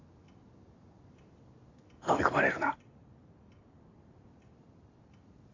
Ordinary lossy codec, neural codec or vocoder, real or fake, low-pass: AAC, 32 kbps; none; real; 7.2 kHz